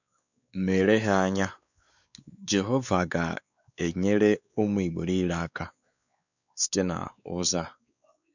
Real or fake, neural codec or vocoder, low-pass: fake; codec, 16 kHz, 4 kbps, X-Codec, WavLM features, trained on Multilingual LibriSpeech; 7.2 kHz